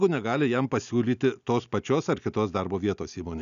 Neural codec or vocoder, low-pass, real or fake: none; 7.2 kHz; real